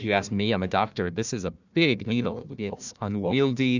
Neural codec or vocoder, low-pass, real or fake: codec, 16 kHz, 1 kbps, FunCodec, trained on Chinese and English, 50 frames a second; 7.2 kHz; fake